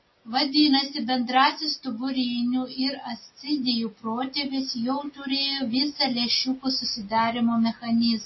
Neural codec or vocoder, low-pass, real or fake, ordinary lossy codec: none; 7.2 kHz; real; MP3, 24 kbps